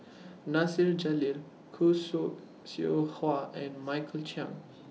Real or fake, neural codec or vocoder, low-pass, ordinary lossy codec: real; none; none; none